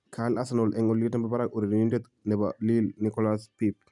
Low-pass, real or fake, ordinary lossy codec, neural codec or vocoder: 10.8 kHz; real; none; none